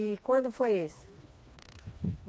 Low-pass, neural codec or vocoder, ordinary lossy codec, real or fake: none; codec, 16 kHz, 2 kbps, FreqCodec, smaller model; none; fake